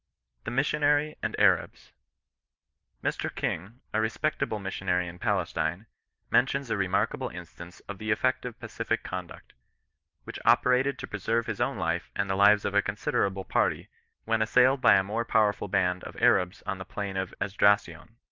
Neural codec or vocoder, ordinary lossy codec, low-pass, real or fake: none; Opus, 24 kbps; 7.2 kHz; real